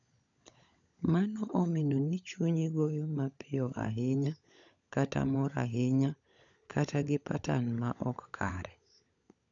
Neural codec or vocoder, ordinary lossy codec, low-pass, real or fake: codec, 16 kHz, 16 kbps, FunCodec, trained on LibriTTS, 50 frames a second; none; 7.2 kHz; fake